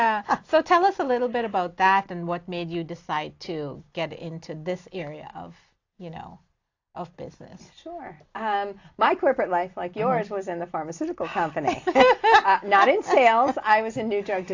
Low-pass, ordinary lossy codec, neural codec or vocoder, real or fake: 7.2 kHz; AAC, 48 kbps; none; real